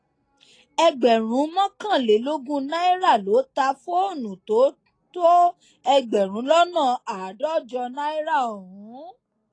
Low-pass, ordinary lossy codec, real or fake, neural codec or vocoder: 9.9 kHz; AAC, 32 kbps; real; none